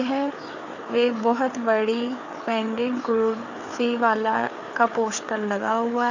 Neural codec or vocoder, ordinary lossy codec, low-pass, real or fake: codec, 16 kHz, 4 kbps, FreqCodec, larger model; none; 7.2 kHz; fake